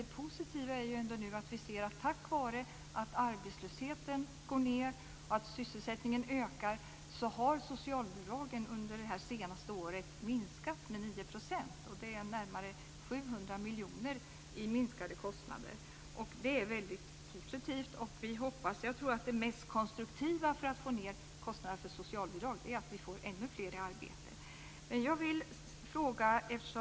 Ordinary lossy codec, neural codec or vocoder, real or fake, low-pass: none; none; real; none